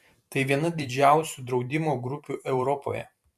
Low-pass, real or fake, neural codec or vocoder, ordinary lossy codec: 14.4 kHz; fake; vocoder, 44.1 kHz, 128 mel bands every 512 samples, BigVGAN v2; MP3, 96 kbps